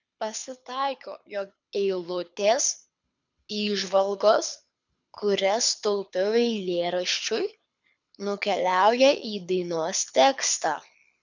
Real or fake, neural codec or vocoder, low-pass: fake; codec, 24 kHz, 6 kbps, HILCodec; 7.2 kHz